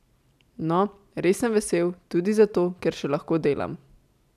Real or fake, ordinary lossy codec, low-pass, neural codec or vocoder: real; none; 14.4 kHz; none